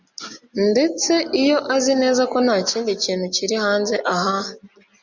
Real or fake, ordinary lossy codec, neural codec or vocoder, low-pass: real; Opus, 64 kbps; none; 7.2 kHz